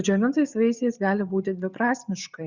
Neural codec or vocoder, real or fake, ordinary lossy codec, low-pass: none; real; Opus, 64 kbps; 7.2 kHz